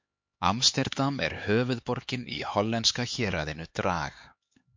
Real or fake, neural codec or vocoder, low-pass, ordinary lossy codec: fake; codec, 16 kHz, 4 kbps, X-Codec, HuBERT features, trained on LibriSpeech; 7.2 kHz; MP3, 48 kbps